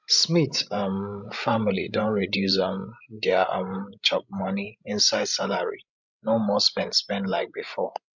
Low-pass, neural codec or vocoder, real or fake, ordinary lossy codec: 7.2 kHz; codec, 16 kHz, 16 kbps, FreqCodec, larger model; fake; MP3, 64 kbps